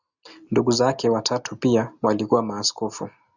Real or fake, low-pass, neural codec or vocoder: real; 7.2 kHz; none